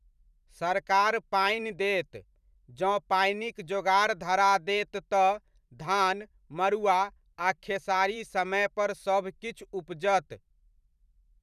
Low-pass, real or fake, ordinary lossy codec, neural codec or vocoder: 14.4 kHz; fake; none; vocoder, 44.1 kHz, 128 mel bands every 512 samples, BigVGAN v2